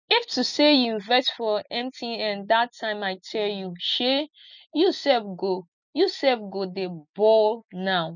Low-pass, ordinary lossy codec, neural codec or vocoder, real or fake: 7.2 kHz; none; none; real